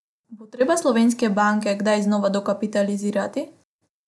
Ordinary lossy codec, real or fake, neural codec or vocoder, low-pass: none; real; none; none